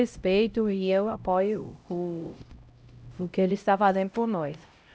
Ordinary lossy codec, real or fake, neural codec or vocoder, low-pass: none; fake; codec, 16 kHz, 0.5 kbps, X-Codec, HuBERT features, trained on LibriSpeech; none